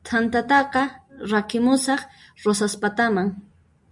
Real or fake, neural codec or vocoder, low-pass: real; none; 10.8 kHz